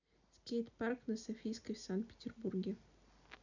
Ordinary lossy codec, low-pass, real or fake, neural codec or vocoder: none; 7.2 kHz; real; none